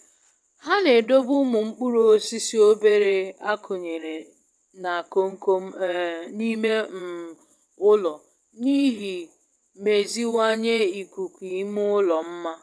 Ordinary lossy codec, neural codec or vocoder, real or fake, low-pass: none; vocoder, 22.05 kHz, 80 mel bands, WaveNeXt; fake; none